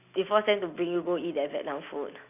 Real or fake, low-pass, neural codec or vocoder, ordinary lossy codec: real; 3.6 kHz; none; none